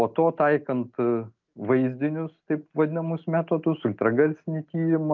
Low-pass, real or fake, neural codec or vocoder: 7.2 kHz; real; none